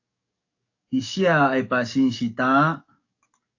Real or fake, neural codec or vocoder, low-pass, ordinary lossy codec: fake; codec, 44.1 kHz, 7.8 kbps, DAC; 7.2 kHz; AAC, 48 kbps